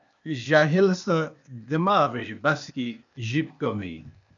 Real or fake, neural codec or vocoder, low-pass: fake; codec, 16 kHz, 0.8 kbps, ZipCodec; 7.2 kHz